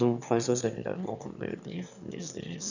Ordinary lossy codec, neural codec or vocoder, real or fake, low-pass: none; autoencoder, 22.05 kHz, a latent of 192 numbers a frame, VITS, trained on one speaker; fake; 7.2 kHz